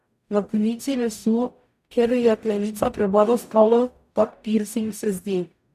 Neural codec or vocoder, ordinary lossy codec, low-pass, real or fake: codec, 44.1 kHz, 0.9 kbps, DAC; none; 14.4 kHz; fake